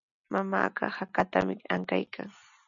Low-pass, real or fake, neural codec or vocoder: 7.2 kHz; real; none